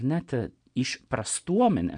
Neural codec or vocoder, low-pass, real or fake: none; 9.9 kHz; real